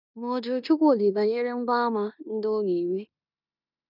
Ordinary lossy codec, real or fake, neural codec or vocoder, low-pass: none; fake; codec, 16 kHz in and 24 kHz out, 0.9 kbps, LongCat-Audio-Codec, four codebook decoder; 5.4 kHz